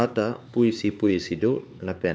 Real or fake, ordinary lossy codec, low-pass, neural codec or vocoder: fake; none; none; codec, 16 kHz, 4 kbps, X-Codec, HuBERT features, trained on LibriSpeech